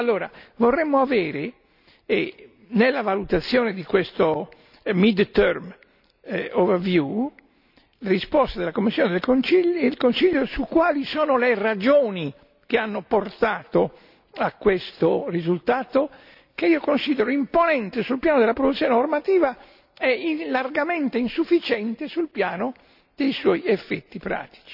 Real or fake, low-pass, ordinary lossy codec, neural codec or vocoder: real; 5.4 kHz; none; none